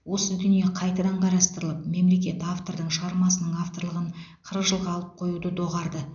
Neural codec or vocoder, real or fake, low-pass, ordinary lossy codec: none; real; 7.2 kHz; none